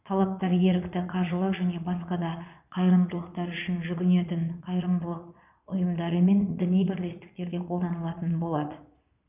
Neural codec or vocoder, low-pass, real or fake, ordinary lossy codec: vocoder, 22.05 kHz, 80 mel bands, Vocos; 3.6 kHz; fake; none